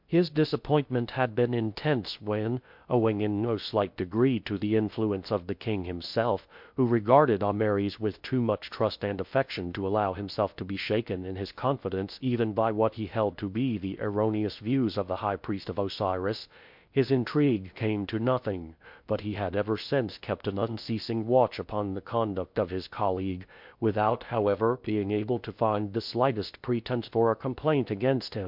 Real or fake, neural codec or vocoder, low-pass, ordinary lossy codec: fake; codec, 16 kHz in and 24 kHz out, 0.6 kbps, FocalCodec, streaming, 2048 codes; 5.4 kHz; MP3, 48 kbps